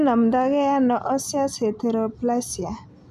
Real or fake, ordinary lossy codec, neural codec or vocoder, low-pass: real; none; none; 14.4 kHz